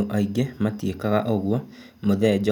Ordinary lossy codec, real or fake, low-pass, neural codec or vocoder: none; real; 19.8 kHz; none